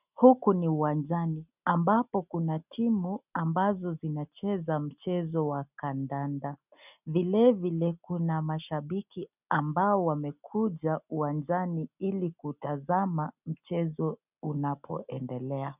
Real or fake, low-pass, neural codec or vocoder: real; 3.6 kHz; none